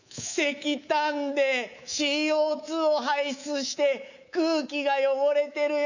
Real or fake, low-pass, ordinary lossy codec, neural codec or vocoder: fake; 7.2 kHz; none; codec, 24 kHz, 3.1 kbps, DualCodec